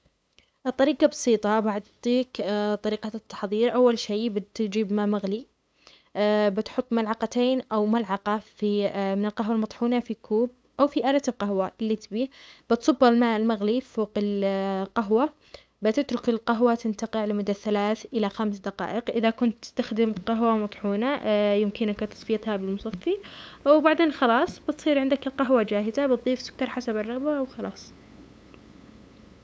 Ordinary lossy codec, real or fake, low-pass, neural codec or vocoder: none; fake; none; codec, 16 kHz, 8 kbps, FunCodec, trained on LibriTTS, 25 frames a second